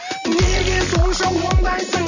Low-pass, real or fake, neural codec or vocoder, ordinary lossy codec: 7.2 kHz; real; none; none